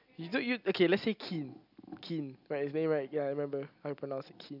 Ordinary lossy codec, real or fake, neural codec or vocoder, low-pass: none; real; none; 5.4 kHz